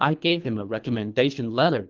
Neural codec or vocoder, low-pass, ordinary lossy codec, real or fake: codec, 24 kHz, 3 kbps, HILCodec; 7.2 kHz; Opus, 32 kbps; fake